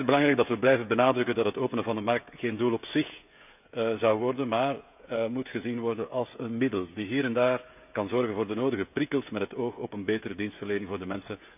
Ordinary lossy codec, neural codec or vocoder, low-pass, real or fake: none; codec, 16 kHz, 16 kbps, FreqCodec, smaller model; 3.6 kHz; fake